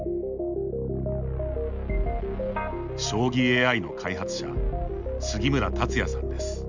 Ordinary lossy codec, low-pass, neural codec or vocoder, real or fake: none; 7.2 kHz; none; real